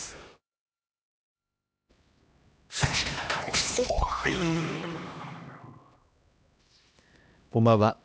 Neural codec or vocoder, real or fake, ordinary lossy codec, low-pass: codec, 16 kHz, 1 kbps, X-Codec, HuBERT features, trained on LibriSpeech; fake; none; none